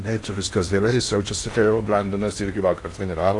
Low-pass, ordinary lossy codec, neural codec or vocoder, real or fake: 10.8 kHz; AAC, 48 kbps; codec, 16 kHz in and 24 kHz out, 0.6 kbps, FocalCodec, streaming, 4096 codes; fake